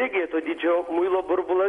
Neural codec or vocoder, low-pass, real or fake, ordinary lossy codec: none; 10.8 kHz; real; MP3, 48 kbps